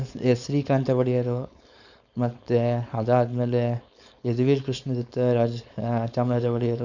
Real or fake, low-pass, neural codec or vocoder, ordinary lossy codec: fake; 7.2 kHz; codec, 16 kHz, 4.8 kbps, FACodec; none